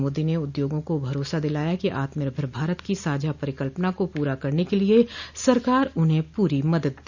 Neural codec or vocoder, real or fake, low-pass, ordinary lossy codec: none; real; 7.2 kHz; none